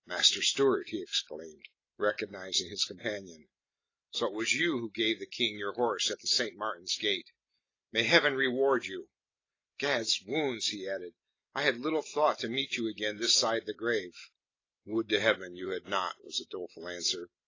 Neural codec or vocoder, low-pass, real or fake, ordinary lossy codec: none; 7.2 kHz; real; AAC, 32 kbps